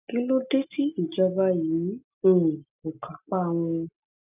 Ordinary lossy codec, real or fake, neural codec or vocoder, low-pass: none; real; none; 3.6 kHz